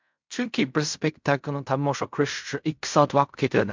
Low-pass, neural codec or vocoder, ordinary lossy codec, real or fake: 7.2 kHz; codec, 16 kHz in and 24 kHz out, 0.4 kbps, LongCat-Audio-Codec, fine tuned four codebook decoder; MP3, 64 kbps; fake